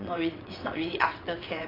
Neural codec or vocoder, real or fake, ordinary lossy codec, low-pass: vocoder, 22.05 kHz, 80 mel bands, WaveNeXt; fake; AAC, 24 kbps; 5.4 kHz